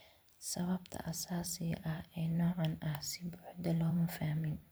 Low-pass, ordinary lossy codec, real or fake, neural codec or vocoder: none; none; fake; vocoder, 44.1 kHz, 128 mel bands every 512 samples, BigVGAN v2